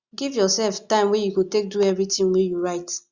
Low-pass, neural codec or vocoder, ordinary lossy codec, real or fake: 7.2 kHz; none; Opus, 64 kbps; real